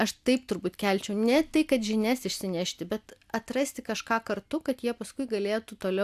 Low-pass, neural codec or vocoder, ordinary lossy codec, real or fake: 14.4 kHz; none; AAC, 96 kbps; real